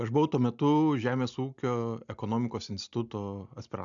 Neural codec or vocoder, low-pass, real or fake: none; 7.2 kHz; real